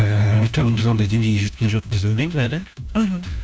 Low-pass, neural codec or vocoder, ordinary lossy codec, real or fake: none; codec, 16 kHz, 1 kbps, FunCodec, trained on LibriTTS, 50 frames a second; none; fake